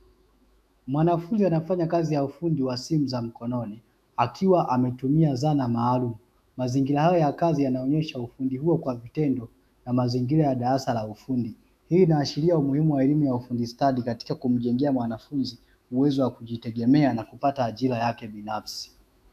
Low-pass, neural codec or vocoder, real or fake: 14.4 kHz; autoencoder, 48 kHz, 128 numbers a frame, DAC-VAE, trained on Japanese speech; fake